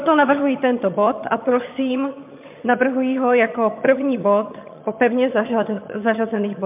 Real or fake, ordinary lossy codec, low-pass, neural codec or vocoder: fake; MP3, 32 kbps; 3.6 kHz; vocoder, 22.05 kHz, 80 mel bands, HiFi-GAN